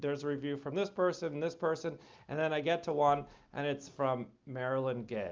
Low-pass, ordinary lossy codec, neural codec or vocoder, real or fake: 7.2 kHz; Opus, 32 kbps; none; real